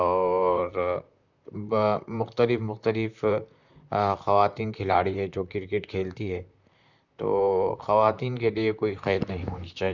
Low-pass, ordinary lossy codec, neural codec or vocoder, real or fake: 7.2 kHz; none; vocoder, 44.1 kHz, 128 mel bands, Pupu-Vocoder; fake